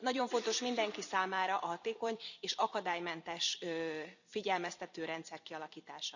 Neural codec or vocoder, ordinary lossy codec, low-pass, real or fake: vocoder, 44.1 kHz, 128 mel bands every 256 samples, BigVGAN v2; none; 7.2 kHz; fake